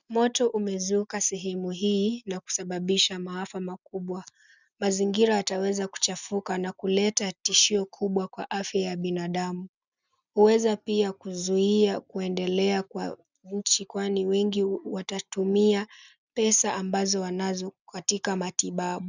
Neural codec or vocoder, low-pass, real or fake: none; 7.2 kHz; real